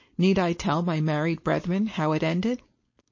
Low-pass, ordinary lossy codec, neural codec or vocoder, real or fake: 7.2 kHz; MP3, 32 kbps; codec, 16 kHz, 4.8 kbps, FACodec; fake